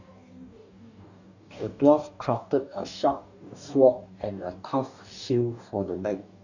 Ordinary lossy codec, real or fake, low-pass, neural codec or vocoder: none; fake; 7.2 kHz; codec, 44.1 kHz, 2.6 kbps, DAC